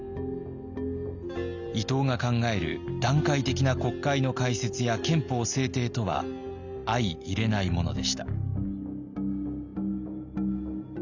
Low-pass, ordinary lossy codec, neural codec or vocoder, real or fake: 7.2 kHz; none; none; real